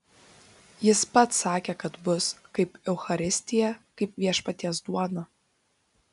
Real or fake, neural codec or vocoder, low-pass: real; none; 10.8 kHz